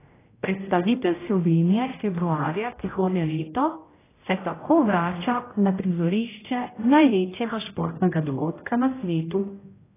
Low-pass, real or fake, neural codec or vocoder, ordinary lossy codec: 3.6 kHz; fake; codec, 16 kHz, 0.5 kbps, X-Codec, HuBERT features, trained on general audio; AAC, 16 kbps